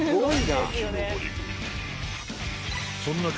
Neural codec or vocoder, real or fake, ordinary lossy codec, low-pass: none; real; none; none